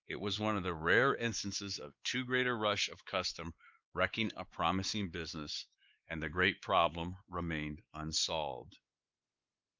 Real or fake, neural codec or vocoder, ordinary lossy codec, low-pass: fake; codec, 16 kHz, 4 kbps, X-Codec, WavLM features, trained on Multilingual LibriSpeech; Opus, 32 kbps; 7.2 kHz